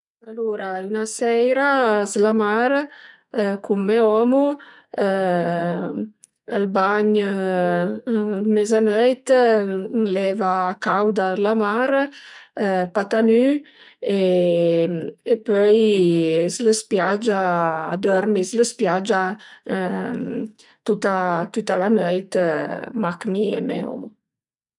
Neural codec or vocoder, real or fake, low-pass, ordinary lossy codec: codec, 44.1 kHz, 2.6 kbps, SNAC; fake; 10.8 kHz; none